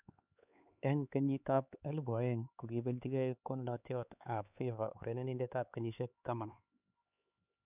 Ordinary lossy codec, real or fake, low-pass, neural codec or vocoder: none; fake; 3.6 kHz; codec, 16 kHz, 4 kbps, X-Codec, HuBERT features, trained on LibriSpeech